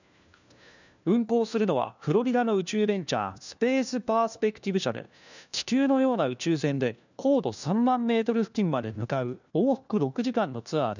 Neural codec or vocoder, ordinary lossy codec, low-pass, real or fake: codec, 16 kHz, 1 kbps, FunCodec, trained on LibriTTS, 50 frames a second; none; 7.2 kHz; fake